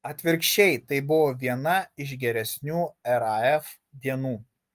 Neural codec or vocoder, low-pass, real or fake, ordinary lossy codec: none; 14.4 kHz; real; Opus, 32 kbps